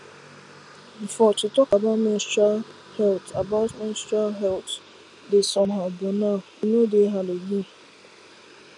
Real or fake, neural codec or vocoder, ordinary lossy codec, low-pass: real; none; none; 10.8 kHz